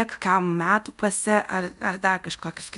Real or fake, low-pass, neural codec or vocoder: fake; 10.8 kHz; codec, 24 kHz, 0.5 kbps, DualCodec